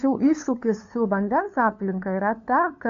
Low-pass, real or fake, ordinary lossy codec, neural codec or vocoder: 7.2 kHz; fake; AAC, 48 kbps; codec, 16 kHz, 2 kbps, FunCodec, trained on LibriTTS, 25 frames a second